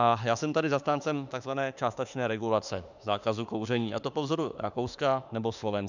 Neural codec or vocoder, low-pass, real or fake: autoencoder, 48 kHz, 32 numbers a frame, DAC-VAE, trained on Japanese speech; 7.2 kHz; fake